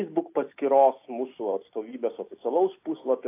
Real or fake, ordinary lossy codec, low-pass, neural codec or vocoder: real; AAC, 24 kbps; 3.6 kHz; none